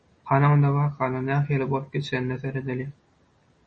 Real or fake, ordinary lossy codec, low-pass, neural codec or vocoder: real; MP3, 32 kbps; 9.9 kHz; none